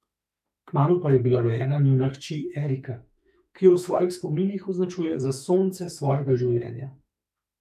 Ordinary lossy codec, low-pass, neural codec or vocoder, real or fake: none; 14.4 kHz; autoencoder, 48 kHz, 32 numbers a frame, DAC-VAE, trained on Japanese speech; fake